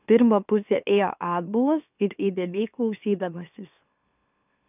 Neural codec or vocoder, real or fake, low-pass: autoencoder, 44.1 kHz, a latent of 192 numbers a frame, MeloTTS; fake; 3.6 kHz